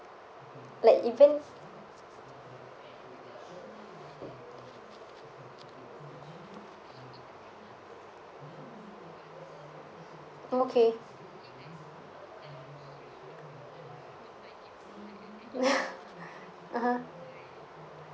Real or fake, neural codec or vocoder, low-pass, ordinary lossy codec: real; none; none; none